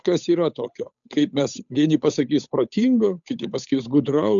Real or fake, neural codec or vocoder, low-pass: fake; codec, 16 kHz, 8 kbps, FunCodec, trained on Chinese and English, 25 frames a second; 7.2 kHz